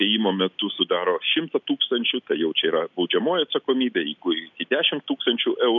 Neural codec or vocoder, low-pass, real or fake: none; 7.2 kHz; real